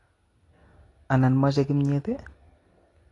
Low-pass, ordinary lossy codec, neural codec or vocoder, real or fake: 10.8 kHz; AAC, 32 kbps; none; real